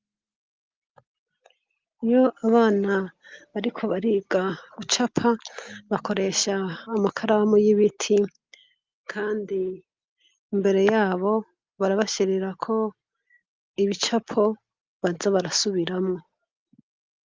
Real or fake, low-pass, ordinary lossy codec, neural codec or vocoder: real; 7.2 kHz; Opus, 32 kbps; none